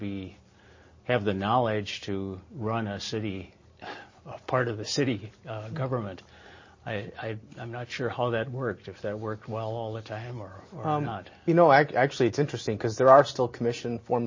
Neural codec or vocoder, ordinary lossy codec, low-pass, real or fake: none; MP3, 32 kbps; 7.2 kHz; real